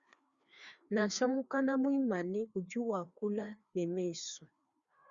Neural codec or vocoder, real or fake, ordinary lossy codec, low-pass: codec, 16 kHz, 2 kbps, FreqCodec, larger model; fake; Opus, 64 kbps; 7.2 kHz